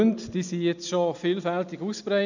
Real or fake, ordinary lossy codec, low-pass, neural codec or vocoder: real; none; 7.2 kHz; none